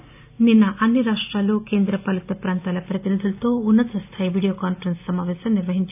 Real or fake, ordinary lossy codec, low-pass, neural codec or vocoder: real; AAC, 32 kbps; 3.6 kHz; none